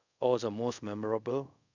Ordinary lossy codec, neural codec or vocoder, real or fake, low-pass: none; codec, 24 kHz, 0.5 kbps, DualCodec; fake; 7.2 kHz